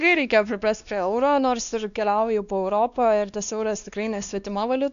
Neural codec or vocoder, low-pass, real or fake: codec, 16 kHz, 2 kbps, X-Codec, WavLM features, trained on Multilingual LibriSpeech; 7.2 kHz; fake